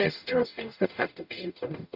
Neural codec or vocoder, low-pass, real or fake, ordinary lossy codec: codec, 44.1 kHz, 0.9 kbps, DAC; 5.4 kHz; fake; none